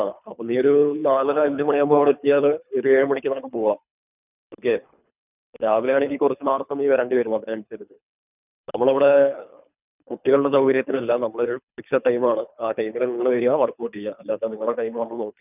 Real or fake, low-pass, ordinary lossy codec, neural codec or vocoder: fake; 3.6 kHz; none; codec, 24 kHz, 3 kbps, HILCodec